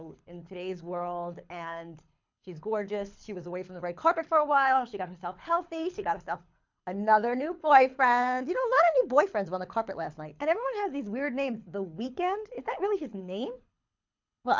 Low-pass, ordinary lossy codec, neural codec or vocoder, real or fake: 7.2 kHz; MP3, 64 kbps; codec, 24 kHz, 6 kbps, HILCodec; fake